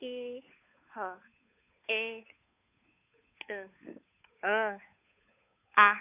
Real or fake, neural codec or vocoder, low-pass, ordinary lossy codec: fake; codec, 16 kHz, 8 kbps, FunCodec, trained on Chinese and English, 25 frames a second; 3.6 kHz; none